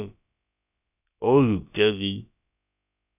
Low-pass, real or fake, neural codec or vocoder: 3.6 kHz; fake; codec, 16 kHz, about 1 kbps, DyCAST, with the encoder's durations